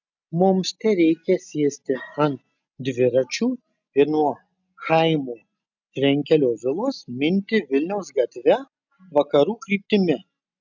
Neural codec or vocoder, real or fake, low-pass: none; real; 7.2 kHz